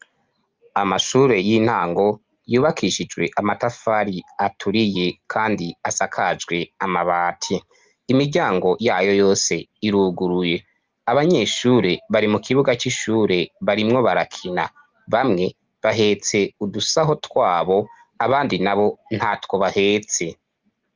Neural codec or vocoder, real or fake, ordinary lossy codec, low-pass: none; real; Opus, 24 kbps; 7.2 kHz